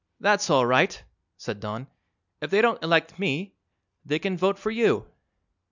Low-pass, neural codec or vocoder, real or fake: 7.2 kHz; none; real